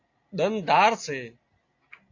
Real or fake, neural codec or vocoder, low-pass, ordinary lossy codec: real; none; 7.2 kHz; AAC, 48 kbps